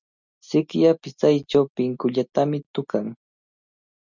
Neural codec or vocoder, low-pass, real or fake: none; 7.2 kHz; real